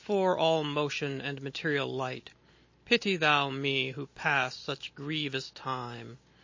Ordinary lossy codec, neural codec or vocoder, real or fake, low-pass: MP3, 32 kbps; none; real; 7.2 kHz